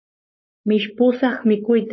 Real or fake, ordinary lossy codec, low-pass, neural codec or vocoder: fake; MP3, 24 kbps; 7.2 kHz; codec, 16 kHz, 4.8 kbps, FACodec